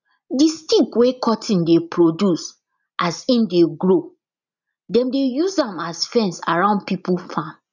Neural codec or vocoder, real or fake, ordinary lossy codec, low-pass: none; real; none; 7.2 kHz